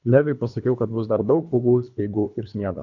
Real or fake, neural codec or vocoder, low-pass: fake; codec, 24 kHz, 3 kbps, HILCodec; 7.2 kHz